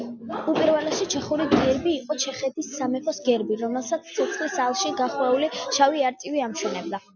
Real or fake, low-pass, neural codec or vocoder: real; 7.2 kHz; none